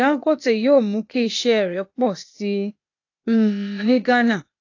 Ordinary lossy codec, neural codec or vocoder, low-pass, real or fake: AAC, 48 kbps; codec, 16 kHz, 0.8 kbps, ZipCodec; 7.2 kHz; fake